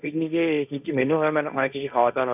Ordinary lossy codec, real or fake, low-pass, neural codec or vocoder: none; fake; 3.6 kHz; codec, 16 kHz, 1.1 kbps, Voila-Tokenizer